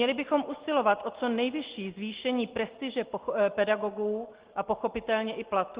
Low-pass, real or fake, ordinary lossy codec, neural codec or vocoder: 3.6 kHz; real; Opus, 16 kbps; none